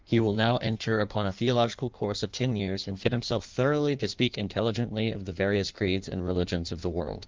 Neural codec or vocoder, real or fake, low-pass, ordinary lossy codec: codec, 16 kHz in and 24 kHz out, 1.1 kbps, FireRedTTS-2 codec; fake; 7.2 kHz; Opus, 32 kbps